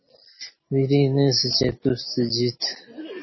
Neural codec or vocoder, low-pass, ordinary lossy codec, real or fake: none; 7.2 kHz; MP3, 24 kbps; real